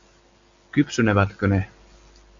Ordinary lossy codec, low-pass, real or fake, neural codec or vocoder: Opus, 64 kbps; 7.2 kHz; real; none